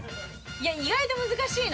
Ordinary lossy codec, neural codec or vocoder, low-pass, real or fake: none; none; none; real